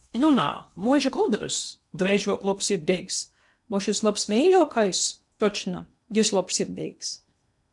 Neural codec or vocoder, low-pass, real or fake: codec, 16 kHz in and 24 kHz out, 0.8 kbps, FocalCodec, streaming, 65536 codes; 10.8 kHz; fake